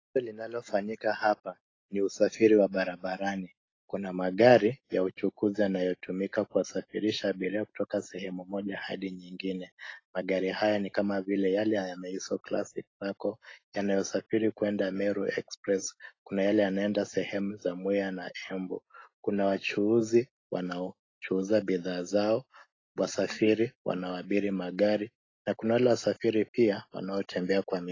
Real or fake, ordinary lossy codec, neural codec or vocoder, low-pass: real; AAC, 32 kbps; none; 7.2 kHz